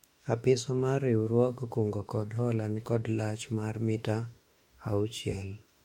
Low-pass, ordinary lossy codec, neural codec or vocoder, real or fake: 19.8 kHz; MP3, 64 kbps; autoencoder, 48 kHz, 32 numbers a frame, DAC-VAE, trained on Japanese speech; fake